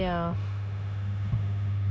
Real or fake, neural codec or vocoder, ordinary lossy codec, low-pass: fake; codec, 16 kHz, 0.9 kbps, LongCat-Audio-Codec; none; none